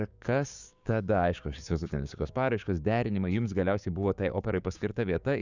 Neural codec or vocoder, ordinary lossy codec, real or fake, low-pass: codec, 16 kHz, 6 kbps, DAC; Opus, 64 kbps; fake; 7.2 kHz